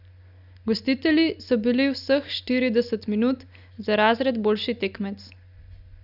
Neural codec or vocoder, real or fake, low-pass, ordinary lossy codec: none; real; 5.4 kHz; none